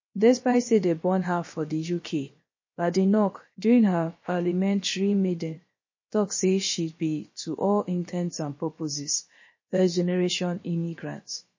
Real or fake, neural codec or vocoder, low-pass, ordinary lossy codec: fake; codec, 16 kHz, about 1 kbps, DyCAST, with the encoder's durations; 7.2 kHz; MP3, 32 kbps